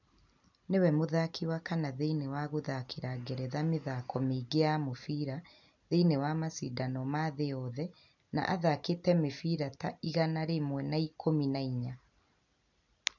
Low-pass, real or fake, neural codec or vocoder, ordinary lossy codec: 7.2 kHz; real; none; none